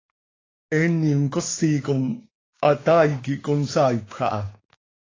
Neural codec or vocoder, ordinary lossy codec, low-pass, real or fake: codec, 16 kHz, 6 kbps, DAC; AAC, 32 kbps; 7.2 kHz; fake